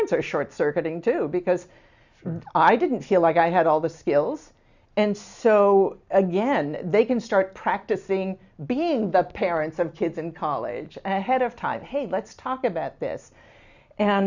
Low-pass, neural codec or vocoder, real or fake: 7.2 kHz; none; real